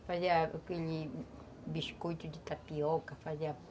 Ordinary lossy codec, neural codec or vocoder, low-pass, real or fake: none; none; none; real